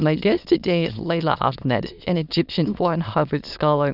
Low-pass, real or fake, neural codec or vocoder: 5.4 kHz; fake; autoencoder, 22.05 kHz, a latent of 192 numbers a frame, VITS, trained on many speakers